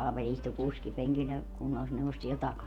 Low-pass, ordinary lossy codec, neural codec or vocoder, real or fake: 19.8 kHz; none; vocoder, 48 kHz, 128 mel bands, Vocos; fake